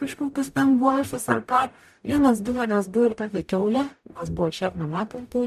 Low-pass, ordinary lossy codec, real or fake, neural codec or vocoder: 14.4 kHz; MP3, 96 kbps; fake; codec, 44.1 kHz, 0.9 kbps, DAC